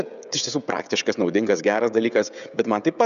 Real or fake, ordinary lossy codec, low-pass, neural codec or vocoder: fake; MP3, 96 kbps; 7.2 kHz; codec, 16 kHz, 16 kbps, FreqCodec, smaller model